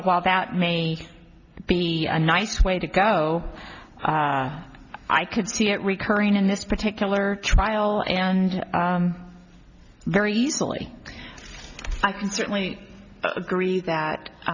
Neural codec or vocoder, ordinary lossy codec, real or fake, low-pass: none; Opus, 64 kbps; real; 7.2 kHz